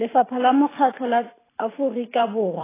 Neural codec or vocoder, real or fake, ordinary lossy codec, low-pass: vocoder, 44.1 kHz, 128 mel bands every 512 samples, BigVGAN v2; fake; AAC, 16 kbps; 3.6 kHz